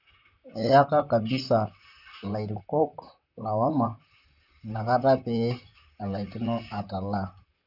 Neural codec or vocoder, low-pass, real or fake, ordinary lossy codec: vocoder, 44.1 kHz, 128 mel bands, Pupu-Vocoder; 5.4 kHz; fake; none